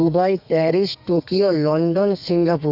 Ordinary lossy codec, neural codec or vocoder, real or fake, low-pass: none; codec, 32 kHz, 1.9 kbps, SNAC; fake; 5.4 kHz